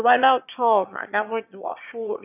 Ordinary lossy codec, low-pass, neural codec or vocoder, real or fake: none; 3.6 kHz; autoencoder, 22.05 kHz, a latent of 192 numbers a frame, VITS, trained on one speaker; fake